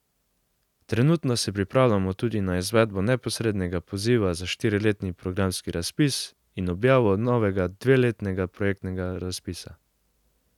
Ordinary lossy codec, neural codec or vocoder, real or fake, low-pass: none; none; real; 19.8 kHz